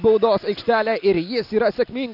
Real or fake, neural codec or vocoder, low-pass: real; none; 5.4 kHz